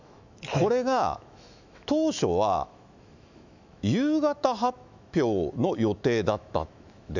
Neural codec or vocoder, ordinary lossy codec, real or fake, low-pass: autoencoder, 48 kHz, 128 numbers a frame, DAC-VAE, trained on Japanese speech; none; fake; 7.2 kHz